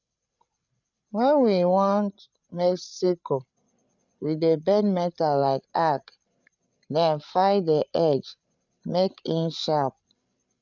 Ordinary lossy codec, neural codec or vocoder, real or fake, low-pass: none; codec, 16 kHz, 8 kbps, FreqCodec, larger model; fake; 7.2 kHz